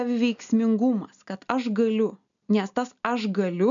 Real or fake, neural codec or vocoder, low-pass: real; none; 7.2 kHz